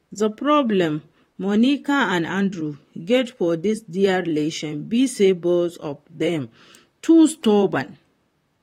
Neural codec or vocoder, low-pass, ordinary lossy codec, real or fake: vocoder, 44.1 kHz, 128 mel bands, Pupu-Vocoder; 19.8 kHz; AAC, 48 kbps; fake